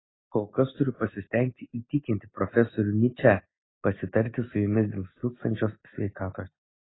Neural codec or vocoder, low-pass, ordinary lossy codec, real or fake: none; 7.2 kHz; AAC, 16 kbps; real